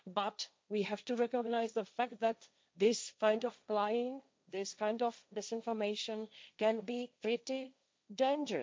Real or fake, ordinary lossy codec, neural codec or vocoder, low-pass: fake; none; codec, 16 kHz, 1.1 kbps, Voila-Tokenizer; none